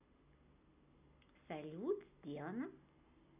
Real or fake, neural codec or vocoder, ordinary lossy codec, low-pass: real; none; none; 3.6 kHz